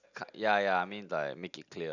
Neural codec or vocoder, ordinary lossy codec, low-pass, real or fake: none; none; 7.2 kHz; real